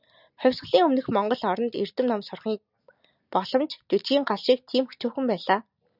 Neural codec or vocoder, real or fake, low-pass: none; real; 5.4 kHz